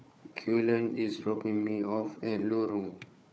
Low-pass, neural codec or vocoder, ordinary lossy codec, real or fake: none; codec, 16 kHz, 4 kbps, FunCodec, trained on Chinese and English, 50 frames a second; none; fake